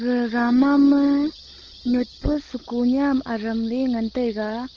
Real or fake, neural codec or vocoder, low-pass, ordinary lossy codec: fake; codec, 16 kHz, 16 kbps, FunCodec, trained on LibriTTS, 50 frames a second; 7.2 kHz; Opus, 16 kbps